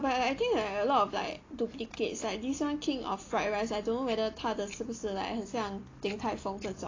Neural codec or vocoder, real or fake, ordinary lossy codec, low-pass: none; real; AAC, 32 kbps; 7.2 kHz